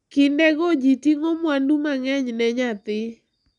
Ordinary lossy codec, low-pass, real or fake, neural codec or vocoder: none; 10.8 kHz; real; none